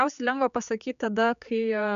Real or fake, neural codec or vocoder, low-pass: fake; codec, 16 kHz, 4 kbps, X-Codec, HuBERT features, trained on general audio; 7.2 kHz